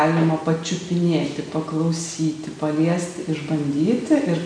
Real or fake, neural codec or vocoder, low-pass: real; none; 9.9 kHz